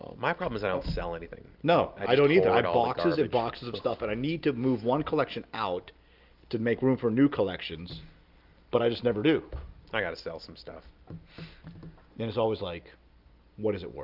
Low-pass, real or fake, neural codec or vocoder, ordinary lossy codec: 5.4 kHz; real; none; Opus, 24 kbps